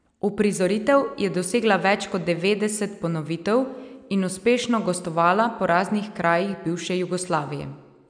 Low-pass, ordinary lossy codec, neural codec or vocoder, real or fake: 9.9 kHz; none; none; real